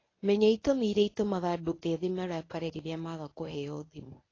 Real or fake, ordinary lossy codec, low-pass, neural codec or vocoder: fake; AAC, 32 kbps; 7.2 kHz; codec, 24 kHz, 0.9 kbps, WavTokenizer, medium speech release version 1